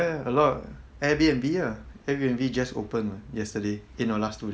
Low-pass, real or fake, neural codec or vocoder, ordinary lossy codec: none; real; none; none